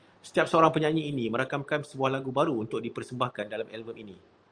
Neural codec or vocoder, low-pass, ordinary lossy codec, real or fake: none; 9.9 kHz; Opus, 32 kbps; real